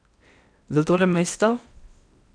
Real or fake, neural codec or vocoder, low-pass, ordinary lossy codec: fake; codec, 16 kHz in and 24 kHz out, 0.8 kbps, FocalCodec, streaming, 65536 codes; 9.9 kHz; none